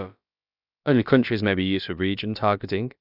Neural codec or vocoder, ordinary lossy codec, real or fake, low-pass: codec, 16 kHz, about 1 kbps, DyCAST, with the encoder's durations; none; fake; 5.4 kHz